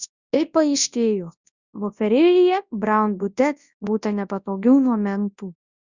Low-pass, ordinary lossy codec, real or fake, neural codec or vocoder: 7.2 kHz; Opus, 64 kbps; fake; codec, 24 kHz, 0.9 kbps, WavTokenizer, large speech release